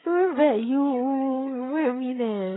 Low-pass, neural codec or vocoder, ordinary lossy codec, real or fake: 7.2 kHz; codec, 16 kHz, 16 kbps, FreqCodec, larger model; AAC, 16 kbps; fake